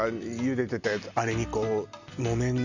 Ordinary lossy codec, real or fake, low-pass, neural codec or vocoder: none; real; 7.2 kHz; none